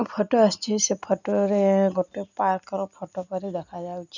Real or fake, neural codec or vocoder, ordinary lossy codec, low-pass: real; none; none; 7.2 kHz